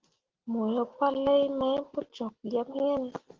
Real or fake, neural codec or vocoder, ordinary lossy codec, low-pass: real; none; Opus, 16 kbps; 7.2 kHz